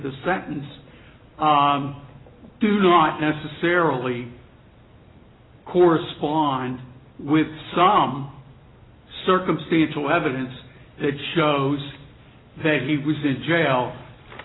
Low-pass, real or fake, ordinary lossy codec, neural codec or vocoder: 7.2 kHz; real; AAC, 16 kbps; none